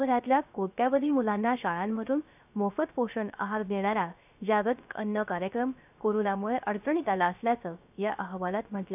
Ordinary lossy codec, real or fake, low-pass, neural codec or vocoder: none; fake; 3.6 kHz; codec, 16 kHz, 0.3 kbps, FocalCodec